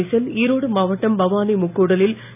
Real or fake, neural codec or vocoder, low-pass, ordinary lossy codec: real; none; 3.6 kHz; none